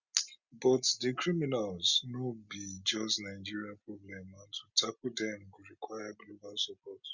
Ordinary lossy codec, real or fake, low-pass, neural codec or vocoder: Opus, 64 kbps; real; 7.2 kHz; none